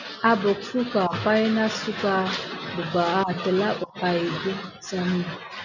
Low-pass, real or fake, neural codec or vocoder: 7.2 kHz; real; none